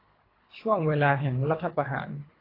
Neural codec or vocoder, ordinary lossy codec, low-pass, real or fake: codec, 24 kHz, 3 kbps, HILCodec; AAC, 24 kbps; 5.4 kHz; fake